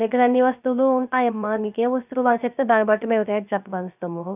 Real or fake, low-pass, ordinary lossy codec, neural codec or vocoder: fake; 3.6 kHz; none; codec, 16 kHz, 0.3 kbps, FocalCodec